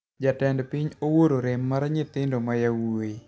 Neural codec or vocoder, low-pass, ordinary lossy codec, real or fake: none; none; none; real